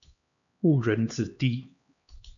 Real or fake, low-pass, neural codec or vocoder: fake; 7.2 kHz; codec, 16 kHz, 2 kbps, X-Codec, HuBERT features, trained on LibriSpeech